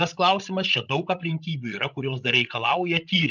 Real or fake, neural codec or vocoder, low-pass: fake; codec, 16 kHz, 16 kbps, FreqCodec, larger model; 7.2 kHz